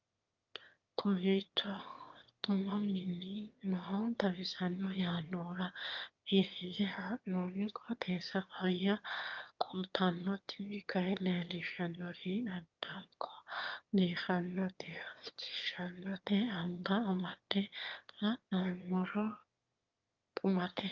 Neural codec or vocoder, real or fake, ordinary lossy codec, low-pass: autoencoder, 22.05 kHz, a latent of 192 numbers a frame, VITS, trained on one speaker; fake; Opus, 24 kbps; 7.2 kHz